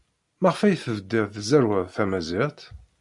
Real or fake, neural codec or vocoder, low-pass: real; none; 10.8 kHz